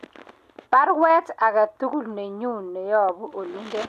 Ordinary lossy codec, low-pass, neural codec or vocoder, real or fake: AAC, 96 kbps; 14.4 kHz; none; real